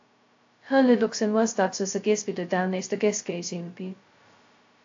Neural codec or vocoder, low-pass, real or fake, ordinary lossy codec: codec, 16 kHz, 0.2 kbps, FocalCodec; 7.2 kHz; fake; AAC, 48 kbps